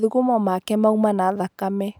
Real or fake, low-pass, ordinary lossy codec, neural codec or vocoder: real; none; none; none